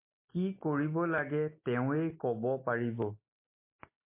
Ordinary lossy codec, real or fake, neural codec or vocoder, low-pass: MP3, 24 kbps; real; none; 3.6 kHz